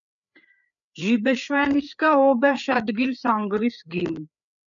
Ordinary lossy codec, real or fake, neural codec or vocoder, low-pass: MP3, 96 kbps; fake; codec, 16 kHz, 4 kbps, FreqCodec, larger model; 7.2 kHz